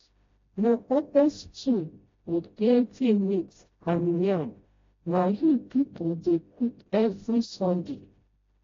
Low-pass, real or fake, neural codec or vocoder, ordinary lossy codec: 7.2 kHz; fake; codec, 16 kHz, 0.5 kbps, FreqCodec, smaller model; AAC, 32 kbps